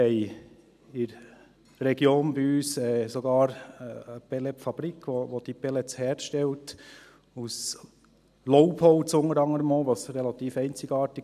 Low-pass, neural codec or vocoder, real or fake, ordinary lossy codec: 14.4 kHz; none; real; none